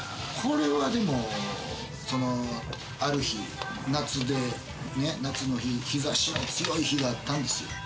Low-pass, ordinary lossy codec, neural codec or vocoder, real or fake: none; none; none; real